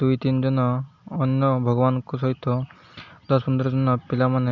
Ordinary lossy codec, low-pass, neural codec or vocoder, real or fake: none; 7.2 kHz; none; real